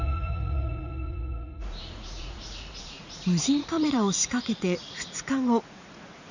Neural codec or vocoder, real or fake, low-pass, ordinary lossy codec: vocoder, 44.1 kHz, 80 mel bands, Vocos; fake; 7.2 kHz; none